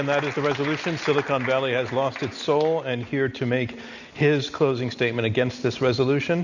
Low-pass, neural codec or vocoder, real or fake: 7.2 kHz; none; real